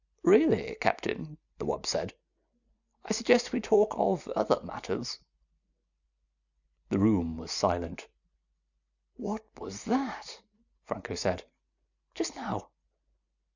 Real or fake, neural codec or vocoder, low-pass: real; none; 7.2 kHz